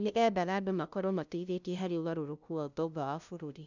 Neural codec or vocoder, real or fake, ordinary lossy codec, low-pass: codec, 16 kHz, 0.5 kbps, FunCodec, trained on LibriTTS, 25 frames a second; fake; none; 7.2 kHz